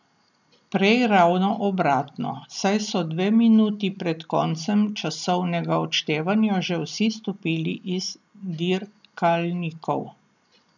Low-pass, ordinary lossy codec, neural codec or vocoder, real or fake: 7.2 kHz; none; none; real